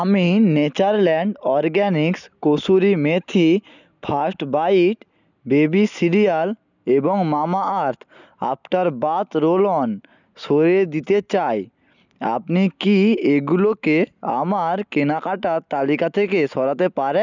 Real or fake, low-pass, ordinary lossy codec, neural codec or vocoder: real; 7.2 kHz; none; none